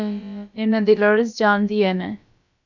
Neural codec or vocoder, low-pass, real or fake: codec, 16 kHz, about 1 kbps, DyCAST, with the encoder's durations; 7.2 kHz; fake